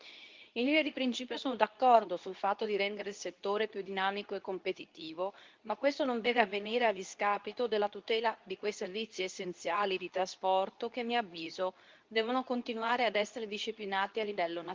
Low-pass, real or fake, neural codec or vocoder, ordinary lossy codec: 7.2 kHz; fake; codec, 24 kHz, 0.9 kbps, WavTokenizer, medium speech release version 2; Opus, 32 kbps